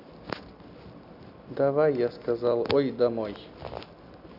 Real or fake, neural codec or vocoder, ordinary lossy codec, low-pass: real; none; none; 5.4 kHz